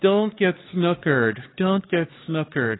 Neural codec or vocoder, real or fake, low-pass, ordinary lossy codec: codec, 16 kHz, 4 kbps, X-Codec, HuBERT features, trained on LibriSpeech; fake; 7.2 kHz; AAC, 16 kbps